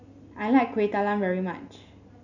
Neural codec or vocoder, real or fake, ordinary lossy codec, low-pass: none; real; none; 7.2 kHz